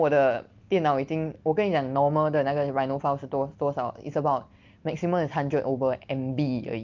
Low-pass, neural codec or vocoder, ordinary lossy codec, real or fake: 7.2 kHz; none; Opus, 24 kbps; real